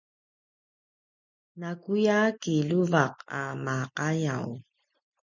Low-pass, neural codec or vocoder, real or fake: 7.2 kHz; none; real